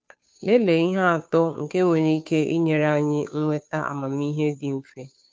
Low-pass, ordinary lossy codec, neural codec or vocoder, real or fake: none; none; codec, 16 kHz, 2 kbps, FunCodec, trained on Chinese and English, 25 frames a second; fake